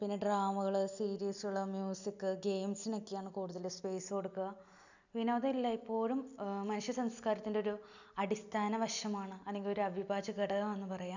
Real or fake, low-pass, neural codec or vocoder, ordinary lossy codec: real; 7.2 kHz; none; none